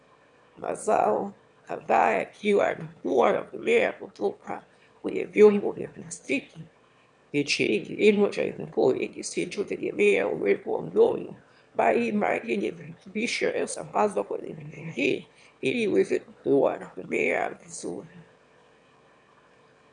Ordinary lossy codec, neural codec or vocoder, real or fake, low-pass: MP3, 96 kbps; autoencoder, 22.05 kHz, a latent of 192 numbers a frame, VITS, trained on one speaker; fake; 9.9 kHz